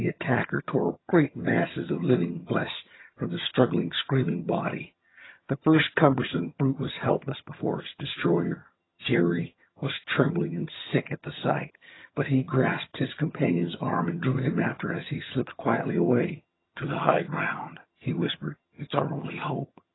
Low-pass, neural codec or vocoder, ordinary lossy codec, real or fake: 7.2 kHz; vocoder, 22.05 kHz, 80 mel bands, HiFi-GAN; AAC, 16 kbps; fake